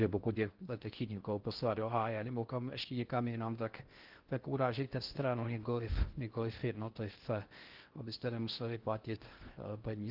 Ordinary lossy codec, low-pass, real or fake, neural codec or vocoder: Opus, 16 kbps; 5.4 kHz; fake; codec, 16 kHz in and 24 kHz out, 0.6 kbps, FocalCodec, streaming, 4096 codes